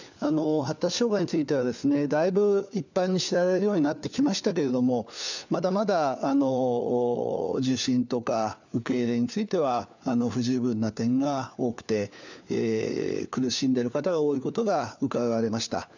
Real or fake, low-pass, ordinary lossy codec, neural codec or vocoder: fake; 7.2 kHz; none; codec, 16 kHz, 4 kbps, FunCodec, trained on LibriTTS, 50 frames a second